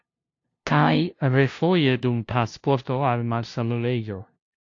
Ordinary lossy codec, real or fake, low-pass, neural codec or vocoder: AAC, 48 kbps; fake; 7.2 kHz; codec, 16 kHz, 0.5 kbps, FunCodec, trained on LibriTTS, 25 frames a second